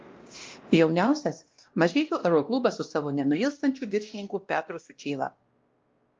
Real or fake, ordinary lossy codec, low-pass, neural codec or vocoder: fake; Opus, 24 kbps; 7.2 kHz; codec, 16 kHz, 1 kbps, X-Codec, WavLM features, trained on Multilingual LibriSpeech